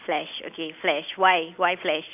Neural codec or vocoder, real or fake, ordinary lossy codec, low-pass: none; real; none; 3.6 kHz